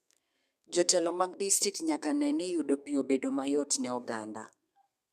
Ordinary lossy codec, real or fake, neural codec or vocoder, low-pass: none; fake; codec, 32 kHz, 1.9 kbps, SNAC; 14.4 kHz